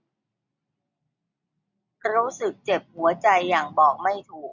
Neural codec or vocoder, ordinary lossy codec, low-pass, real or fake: none; none; 7.2 kHz; real